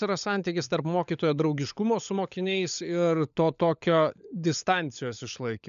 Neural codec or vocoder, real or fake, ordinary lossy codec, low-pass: codec, 16 kHz, 16 kbps, FunCodec, trained on LibriTTS, 50 frames a second; fake; MP3, 96 kbps; 7.2 kHz